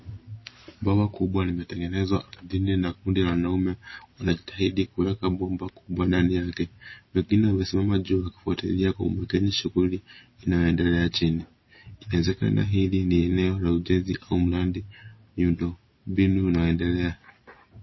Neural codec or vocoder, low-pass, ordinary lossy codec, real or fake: none; 7.2 kHz; MP3, 24 kbps; real